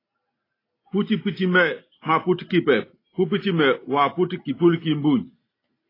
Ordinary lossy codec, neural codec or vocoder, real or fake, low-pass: AAC, 24 kbps; none; real; 5.4 kHz